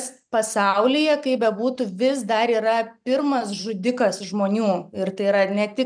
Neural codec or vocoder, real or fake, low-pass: none; real; 9.9 kHz